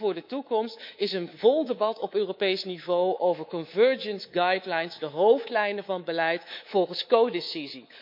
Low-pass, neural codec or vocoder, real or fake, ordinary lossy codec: 5.4 kHz; codec, 24 kHz, 3.1 kbps, DualCodec; fake; MP3, 48 kbps